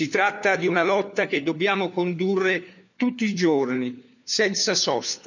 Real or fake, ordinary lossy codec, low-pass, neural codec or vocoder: fake; none; 7.2 kHz; codec, 16 kHz, 4 kbps, FunCodec, trained on LibriTTS, 50 frames a second